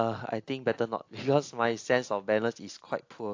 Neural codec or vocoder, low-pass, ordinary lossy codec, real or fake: none; 7.2 kHz; AAC, 48 kbps; real